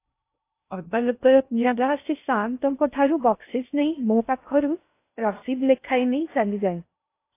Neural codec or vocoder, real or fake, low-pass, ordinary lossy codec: codec, 16 kHz in and 24 kHz out, 0.6 kbps, FocalCodec, streaming, 2048 codes; fake; 3.6 kHz; AAC, 24 kbps